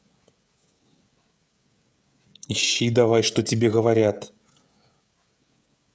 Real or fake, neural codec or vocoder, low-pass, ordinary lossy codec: fake; codec, 16 kHz, 16 kbps, FreqCodec, smaller model; none; none